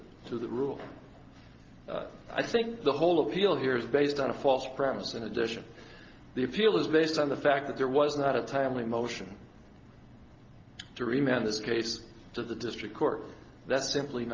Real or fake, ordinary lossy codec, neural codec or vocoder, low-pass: real; Opus, 32 kbps; none; 7.2 kHz